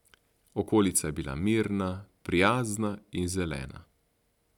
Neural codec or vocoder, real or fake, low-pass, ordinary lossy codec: none; real; 19.8 kHz; none